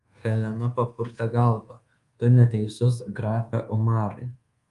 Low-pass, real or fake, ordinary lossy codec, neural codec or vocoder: 10.8 kHz; fake; Opus, 32 kbps; codec, 24 kHz, 1.2 kbps, DualCodec